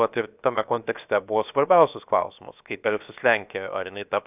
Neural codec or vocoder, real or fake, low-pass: codec, 16 kHz, 0.7 kbps, FocalCodec; fake; 3.6 kHz